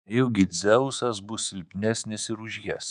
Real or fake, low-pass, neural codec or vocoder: fake; 10.8 kHz; codec, 24 kHz, 3.1 kbps, DualCodec